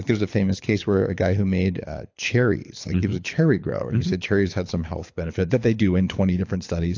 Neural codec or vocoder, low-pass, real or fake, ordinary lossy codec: codec, 24 kHz, 6 kbps, HILCodec; 7.2 kHz; fake; AAC, 48 kbps